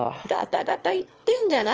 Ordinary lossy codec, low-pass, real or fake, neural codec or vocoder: Opus, 24 kbps; 7.2 kHz; fake; autoencoder, 22.05 kHz, a latent of 192 numbers a frame, VITS, trained on one speaker